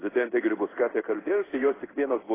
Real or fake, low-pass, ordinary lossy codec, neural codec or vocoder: fake; 3.6 kHz; AAC, 16 kbps; codec, 16 kHz in and 24 kHz out, 1 kbps, XY-Tokenizer